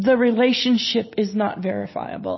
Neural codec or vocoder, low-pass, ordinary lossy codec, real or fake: none; 7.2 kHz; MP3, 24 kbps; real